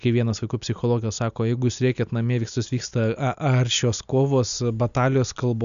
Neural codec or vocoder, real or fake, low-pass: none; real; 7.2 kHz